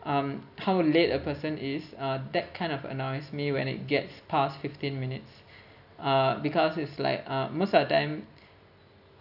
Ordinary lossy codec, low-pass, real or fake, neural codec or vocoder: none; 5.4 kHz; real; none